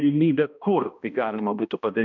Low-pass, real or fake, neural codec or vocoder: 7.2 kHz; fake; codec, 16 kHz, 1 kbps, X-Codec, HuBERT features, trained on balanced general audio